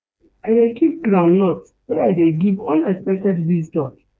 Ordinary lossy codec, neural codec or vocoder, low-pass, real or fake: none; codec, 16 kHz, 2 kbps, FreqCodec, smaller model; none; fake